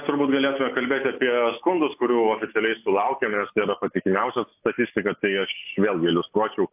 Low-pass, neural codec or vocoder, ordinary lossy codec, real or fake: 3.6 kHz; none; AAC, 32 kbps; real